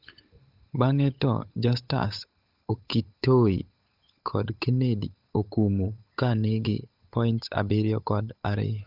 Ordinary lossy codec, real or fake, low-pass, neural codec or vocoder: none; fake; 5.4 kHz; codec, 16 kHz, 8 kbps, FunCodec, trained on Chinese and English, 25 frames a second